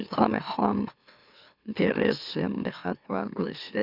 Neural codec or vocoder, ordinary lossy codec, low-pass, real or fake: autoencoder, 44.1 kHz, a latent of 192 numbers a frame, MeloTTS; none; 5.4 kHz; fake